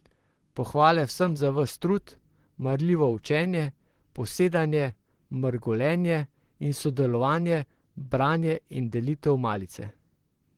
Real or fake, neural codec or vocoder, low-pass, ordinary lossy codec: fake; vocoder, 44.1 kHz, 128 mel bands, Pupu-Vocoder; 19.8 kHz; Opus, 16 kbps